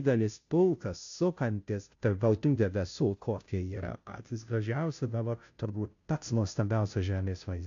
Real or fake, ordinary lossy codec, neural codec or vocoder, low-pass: fake; AAC, 64 kbps; codec, 16 kHz, 0.5 kbps, FunCodec, trained on Chinese and English, 25 frames a second; 7.2 kHz